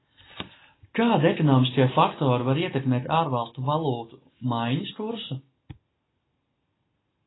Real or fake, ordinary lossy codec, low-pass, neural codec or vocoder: real; AAC, 16 kbps; 7.2 kHz; none